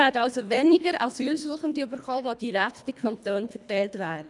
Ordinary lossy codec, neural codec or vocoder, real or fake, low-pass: none; codec, 24 kHz, 1.5 kbps, HILCodec; fake; 10.8 kHz